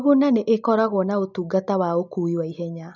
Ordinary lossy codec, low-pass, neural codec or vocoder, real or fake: none; 7.2 kHz; none; real